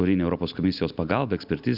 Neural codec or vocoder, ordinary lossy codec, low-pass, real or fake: none; Opus, 64 kbps; 5.4 kHz; real